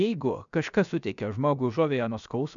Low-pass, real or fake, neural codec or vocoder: 7.2 kHz; fake; codec, 16 kHz, 0.7 kbps, FocalCodec